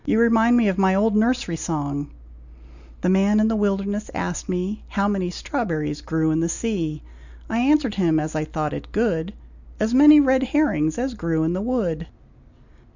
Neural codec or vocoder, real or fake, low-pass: none; real; 7.2 kHz